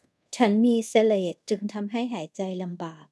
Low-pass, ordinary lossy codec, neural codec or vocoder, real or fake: none; none; codec, 24 kHz, 0.5 kbps, DualCodec; fake